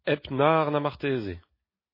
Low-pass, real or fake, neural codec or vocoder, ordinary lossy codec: 5.4 kHz; real; none; MP3, 24 kbps